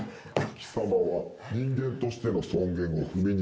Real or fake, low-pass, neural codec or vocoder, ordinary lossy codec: real; none; none; none